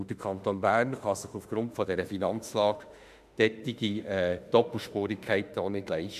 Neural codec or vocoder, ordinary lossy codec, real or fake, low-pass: autoencoder, 48 kHz, 32 numbers a frame, DAC-VAE, trained on Japanese speech; MP3, 96 kbps; fake; 14.4 kHz